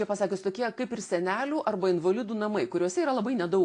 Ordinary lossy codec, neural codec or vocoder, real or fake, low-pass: AAC, 48 kbps; none; real; 10.8 kHz